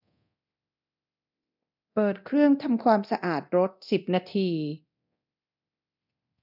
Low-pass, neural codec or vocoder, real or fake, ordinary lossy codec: 5.4 kHz; codec, 24 kHz, 0.9 kbps, DualCodec; fake; none